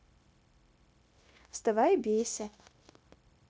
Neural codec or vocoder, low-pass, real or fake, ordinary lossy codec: codec, 16 kHz, 0.9 kbps, LongCat-Audio-Codec; none; fake; none